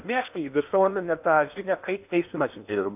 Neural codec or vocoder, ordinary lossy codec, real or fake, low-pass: codec, 16 kHz in and 24 kHz out, 0.8 kbps, FocalCodec, streaming, 65536 codes; AAC, 32 kbps; fake; 3.6 kHz